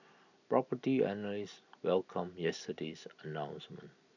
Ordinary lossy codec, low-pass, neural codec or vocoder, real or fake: none; 7.2 kHz; none; real